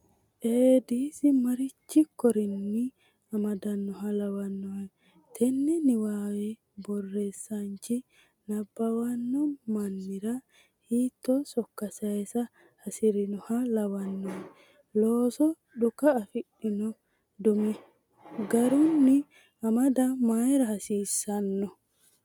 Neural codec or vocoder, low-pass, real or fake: none; 19.8 kHz; real